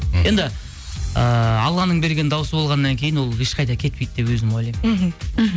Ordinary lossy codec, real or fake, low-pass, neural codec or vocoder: none; real; none; none